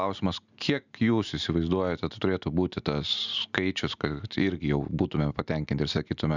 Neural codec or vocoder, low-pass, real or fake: none; 7.2 kHz; real